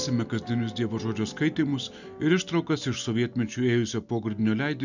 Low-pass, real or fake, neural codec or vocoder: 7.2 kHz; real; none